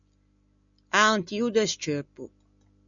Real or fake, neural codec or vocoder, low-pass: real; none; 7.2 kHz